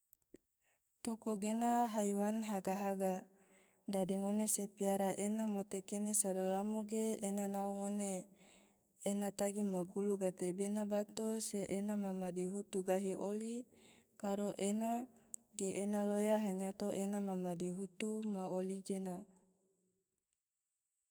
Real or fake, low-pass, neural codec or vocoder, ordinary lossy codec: fake; none; codec, 44.1 kHz, 2.6 kbps, SNAC; none